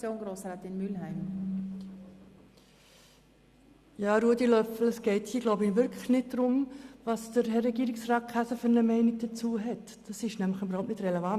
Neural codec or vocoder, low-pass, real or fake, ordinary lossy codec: none; 14.4 kHz; real; Opus, 64 kbps